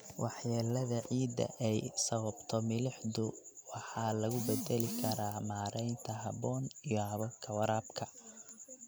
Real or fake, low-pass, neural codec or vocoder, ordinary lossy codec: real; none; none; none